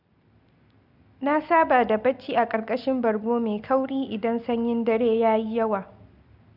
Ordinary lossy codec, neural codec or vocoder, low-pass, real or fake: none; none; 5.4 kHz; real